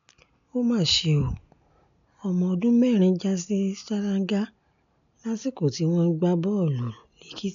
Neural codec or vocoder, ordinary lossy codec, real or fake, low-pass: none; none; real; 7.2 kHz